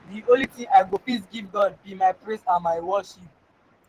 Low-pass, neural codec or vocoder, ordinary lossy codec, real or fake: 14.4 kHz; vocoder, 44.1 kHz, 128 mel bands every 512 samples, BigVGAN v2; Opus, 16 kbps; fake